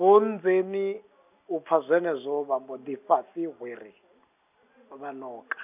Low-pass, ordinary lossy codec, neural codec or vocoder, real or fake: 3.6 kHz; none; none; real